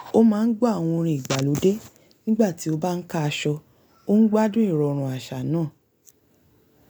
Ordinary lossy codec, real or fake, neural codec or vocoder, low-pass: none; real; none; none